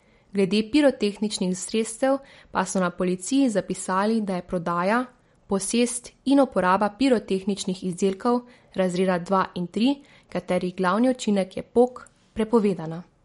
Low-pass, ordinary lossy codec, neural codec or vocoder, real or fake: 19.8 kHz; MP3, 48 kbps; none; real